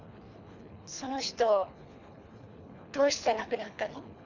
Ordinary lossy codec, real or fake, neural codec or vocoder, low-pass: none; fake; codec, 24 kHz, 3 kbps, HILCodec; 7.2 kHz